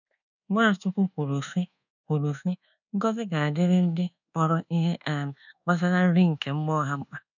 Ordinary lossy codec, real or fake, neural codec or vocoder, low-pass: none; fake; codec, 24 kHz, 1.2 kbps, DualCodec; 7.2 kHz